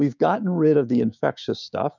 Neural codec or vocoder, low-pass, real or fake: codec, 16 kHz, 6 kbps, DAC; 7.2 kHz; fake